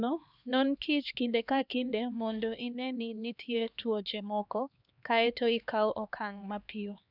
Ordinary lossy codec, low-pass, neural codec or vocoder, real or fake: none; 5.4 kHz; codec, 16 kHz, 2 kbps, X-Codec, HuBERT features, trained on LibriSpeech; fake